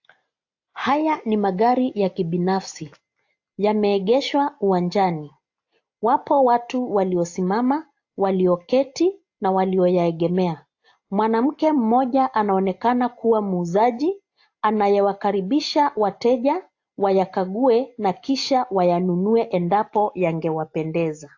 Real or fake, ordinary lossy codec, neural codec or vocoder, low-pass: real; AAC, 48 kbps; none; 7.2 kHz